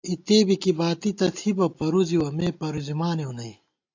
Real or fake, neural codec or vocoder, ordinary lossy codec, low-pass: real; none; AAC, 48 kbps; 7.2 kHz